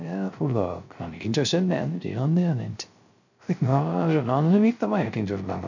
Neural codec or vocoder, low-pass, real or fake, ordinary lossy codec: codec, 16 kHz, 0.3 kbps, FocalCodec; 7.2 kHz; fake; none